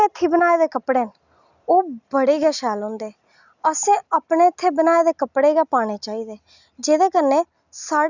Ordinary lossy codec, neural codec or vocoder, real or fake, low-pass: none; none; real; 7.2 kHz